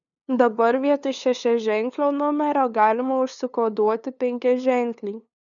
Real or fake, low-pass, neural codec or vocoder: fake; 7.2 kHz; codec, 16 kHz, 2 kbps, FunCodec, trained on LibriTTS, 25 frames a second